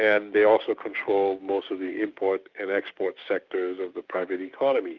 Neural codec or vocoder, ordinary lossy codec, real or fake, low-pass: codec, 16 kHz, 6 kbps, DAC; Opus, 24 kbps; fake; 7.2 kHz